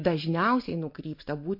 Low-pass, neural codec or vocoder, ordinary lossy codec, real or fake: 5.4 kHz; none; MP3, 32 kbps; real